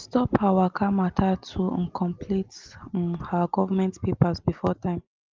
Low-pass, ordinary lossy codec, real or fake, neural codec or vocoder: 7.2 kHz; Opus, 24 kbps; real; none